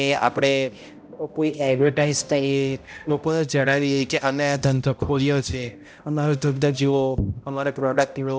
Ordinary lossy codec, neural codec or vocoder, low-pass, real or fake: none; codec, 16 kHz, 0.5 kbps, X-Codec, HuBERT features, trained on balanced general audio; none; fake